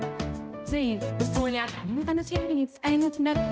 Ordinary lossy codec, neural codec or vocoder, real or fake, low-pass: none; codec, 16 kHz, 0.5 kbps, X-Codec, HuBERT features, trained on balanced general audio; fake; none